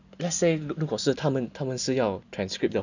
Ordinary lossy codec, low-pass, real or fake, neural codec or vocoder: none; 7.2 kHz; real; none